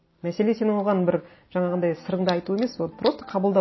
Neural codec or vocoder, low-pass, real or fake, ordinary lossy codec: none; 7.2 kHz; real; MP3, 24 kbps